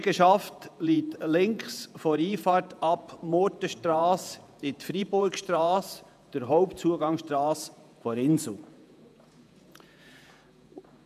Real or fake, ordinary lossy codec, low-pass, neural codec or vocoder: fake; none; 14.4 kHz; vocoder, 48 kHz, 128 mel bands, Vocos